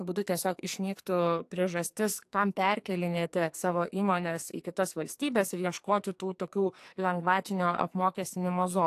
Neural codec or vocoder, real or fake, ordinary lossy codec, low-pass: codec, 44.1 kHz, 2.6 kbps, SNAC; fake; AAC, 64 kbps; 14.4 kHz